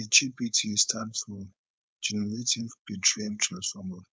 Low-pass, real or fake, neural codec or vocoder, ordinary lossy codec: none; fake; codec, 16 kHz, 4.8 kbps, FACodec; none